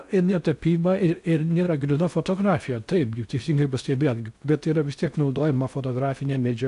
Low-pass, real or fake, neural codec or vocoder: 10.8 kHz; fake; codec, 16 kHz in and 24 kHz out, 0.6 kbps, FocalCodec, streaming, 4096 codes